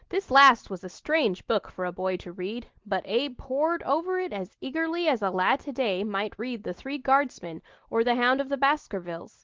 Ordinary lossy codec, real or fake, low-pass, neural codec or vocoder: Opus, 16 kbps; real; 7.2 kHz; none